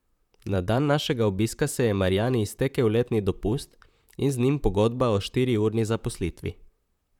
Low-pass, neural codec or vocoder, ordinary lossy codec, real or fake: 19.8 kHz; vocoder, 44.1 kHz, 128 mel bands, Pupu-Vocoder; none; fake